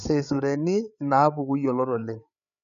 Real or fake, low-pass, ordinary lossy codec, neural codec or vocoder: fake; 7.2 kHz; none; codec, 16 kHz, 8 kbps, FreqCodec, larger model